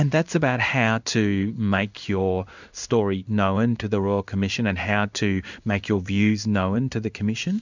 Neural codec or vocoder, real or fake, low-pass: codec, 16 kHz in and 24 kHz out, 1 kbps, XY-Tokenizer; fake; 7.2 kHz